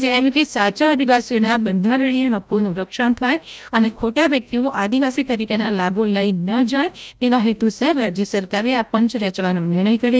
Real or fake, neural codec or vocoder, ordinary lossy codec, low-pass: fake; codec, 16 kHz, 0.5 kbps, FreqCodec, larger model; none; none